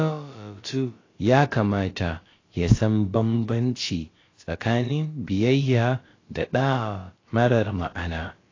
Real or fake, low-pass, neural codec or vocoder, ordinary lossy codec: fake; 7.2 kHz; codec, 16 kHz, about 1 kbps, DyCAST, with the encoder's durations; AAC, 32 kbps